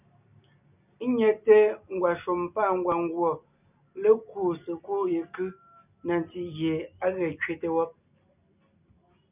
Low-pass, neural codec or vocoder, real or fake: 3.6 kHz; none; real